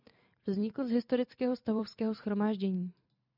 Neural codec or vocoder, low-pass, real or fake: vocoder, 44.1 kHz, 128 mel bands every 512 samples, BigVGAN v2; 5.4 kHz; fake